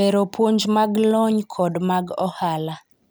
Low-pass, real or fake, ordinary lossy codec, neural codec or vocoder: none; real; none; none